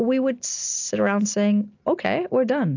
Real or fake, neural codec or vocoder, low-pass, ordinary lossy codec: real; none; 7.2 kHz; AAC, 48 kbps